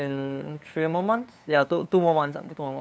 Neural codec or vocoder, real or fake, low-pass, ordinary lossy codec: codec, 16 kHz, 2 kbps, FunCodec, trained on LibriTTS, 25 frames a second; fake; none; none